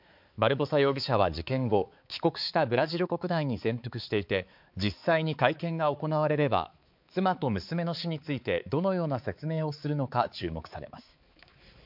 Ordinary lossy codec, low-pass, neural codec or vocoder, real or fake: MP3, 48 kbps; 5.4 kHz; codec, 16 kHz, 4 kbps, X-Codec, HuBERT features, trained on balanced general audio; fake